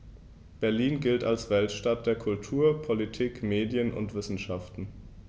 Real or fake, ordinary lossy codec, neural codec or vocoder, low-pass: real; none; none; none